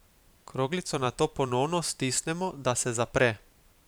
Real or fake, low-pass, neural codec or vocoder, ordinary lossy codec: fake; none; vocoder, 44.1 kHz, 128 mel bands every 512 samples, BigVGAN v2; none